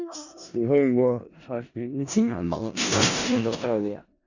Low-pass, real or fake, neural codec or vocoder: 7.2 kHz; fake; codec, 16 kHz in and 24 kHz out, 0.4 kbps, LongCat-Audio-Codec, four codebook decoder